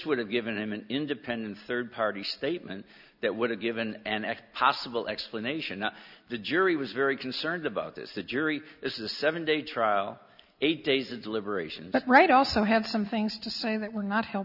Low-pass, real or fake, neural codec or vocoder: 5.4 kHz; real; none